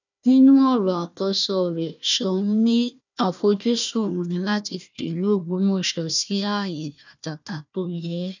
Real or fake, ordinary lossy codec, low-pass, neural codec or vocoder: fake; none; 7.2 kHz; codec, 16 kHz, 1 kbps, FunCodec, trained on Chinese and English, 50 frames a second